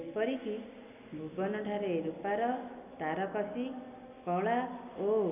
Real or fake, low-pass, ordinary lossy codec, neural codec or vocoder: real; 3.6 kHz; none; none